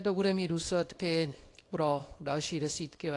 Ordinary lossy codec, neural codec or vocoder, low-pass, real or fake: AAC, 48 kbps; codec, 24 kHz, 0.9 kbps, WavTokenizer, small release; 10.8 kHz; fake